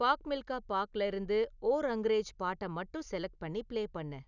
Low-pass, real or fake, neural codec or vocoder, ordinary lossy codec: 7.2 kHz; real; none; none